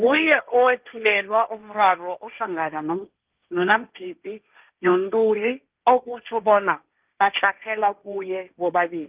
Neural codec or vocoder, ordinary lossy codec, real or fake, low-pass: codec, 16 kHz, 1.1 kbps, Voila-Tokenizer; Opus, 32 kbps; fake; 3.6 kHz